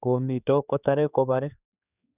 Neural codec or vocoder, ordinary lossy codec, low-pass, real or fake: codec, 16 kHz, 4 kbps, X-Codec, HuBERT features, trained on general audio; none; 3.6 kHz; fake